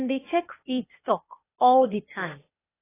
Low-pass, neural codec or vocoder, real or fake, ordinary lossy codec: 3.6 kHz; codec, 16 kHz, 0.8 kbps, ZipCodec; fake; AAC, 16 kbps